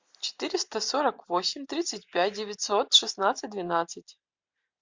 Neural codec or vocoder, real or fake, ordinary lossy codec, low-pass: none; real; MP3, 64 kbps; 7.2 kHz